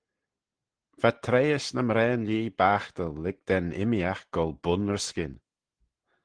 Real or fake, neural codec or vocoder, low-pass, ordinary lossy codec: real; none; 9.9 kHz; Opus, 16 kbps